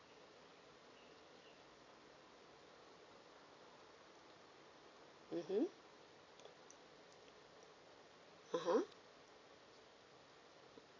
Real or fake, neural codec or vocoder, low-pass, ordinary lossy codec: real; none; 7.2 kHz; AAC, 32 kbps